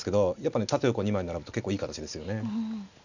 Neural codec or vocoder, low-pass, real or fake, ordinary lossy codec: none; 7.2 kHz; real; none